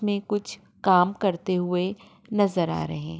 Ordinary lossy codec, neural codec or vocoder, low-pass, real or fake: none; none; none; real